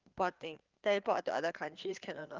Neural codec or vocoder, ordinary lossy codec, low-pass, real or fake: codec, 16 kHz, 4 kbps, FreqCodec, larger model; Opus, 24 kbps; 7.2 kHz; fake